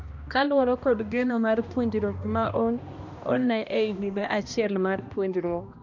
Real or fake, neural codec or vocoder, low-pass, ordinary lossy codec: fake; codec, 16 kHz, 1 kbps, X-Codec, HuBERT features, trained on balanced general audio; 7.2 kHz; none